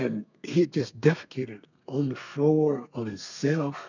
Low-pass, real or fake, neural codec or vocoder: 7.2 kHz; fake; codec, 32 kHz, 1.9 kbps, SNAC